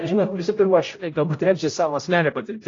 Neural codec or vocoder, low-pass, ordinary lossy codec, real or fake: codec, 16 kHz, 0.5 kbps, X-Codec, HuBERT features, trained on general audio; 7.2 kHz; AAC, 32 kbps; fake